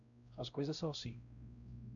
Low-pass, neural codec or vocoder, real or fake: 7.2 kHz; codec, 16 kHz, 1 kbps, X-Codec, WavLM features, trained on Multilingual LibriSpeech; fake